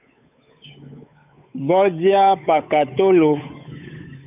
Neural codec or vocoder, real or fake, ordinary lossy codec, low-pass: codec, 16 kHz, 8 kbps, FunCodec, trained on Chinese and English, 25 frames a second; fake; AAC, 32 kbps; 3.6 kHz